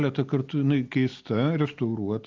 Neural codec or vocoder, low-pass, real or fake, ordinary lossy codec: none; 7.2 kHz; real; Opus, 24 kbps